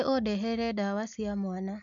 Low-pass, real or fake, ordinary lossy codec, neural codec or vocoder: 7.2 kHz; real; none; none